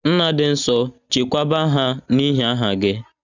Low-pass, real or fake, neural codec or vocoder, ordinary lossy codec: 7.2 kHz; real; none; none